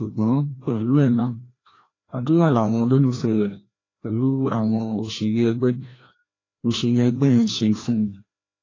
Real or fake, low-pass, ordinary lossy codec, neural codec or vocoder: fake; 7.2 kHz; AAC, 32 kbps; codec, 16 kHz, 1 kbps, FreqCodec, larger model